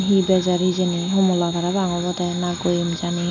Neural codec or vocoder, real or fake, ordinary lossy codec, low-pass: none; real; none; 7.2 kHz